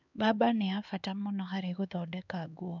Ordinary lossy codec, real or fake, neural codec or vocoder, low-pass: none; fake; codec, 16 kHz, 4 kbps, X-Codec, HuBERT features, trained on LibriSpeech; 7.2 kHz